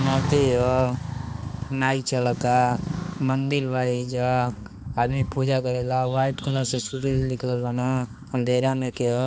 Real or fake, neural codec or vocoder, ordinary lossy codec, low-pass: fake; codec, 16 kHz, 2 kbps, X-Codec, HuBERT features, trained on balanced general audio; none; none